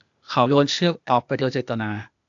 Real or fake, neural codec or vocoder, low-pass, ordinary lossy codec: fake; codec, 16 kHz, 0.8 kbps, ZipCodec; 7.2 kHz; AAC, 64 kbps